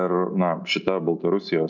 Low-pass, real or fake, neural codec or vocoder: 7.2 kHz; real; none